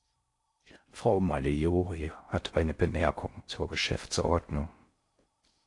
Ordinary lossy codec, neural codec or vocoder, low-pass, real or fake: AAC, 48 kbps; codec, 16 kHz in and 24 kHz out, 0.6 kbps, FocalCodec, streaming, 4096 codes; 10.8 kHz; fake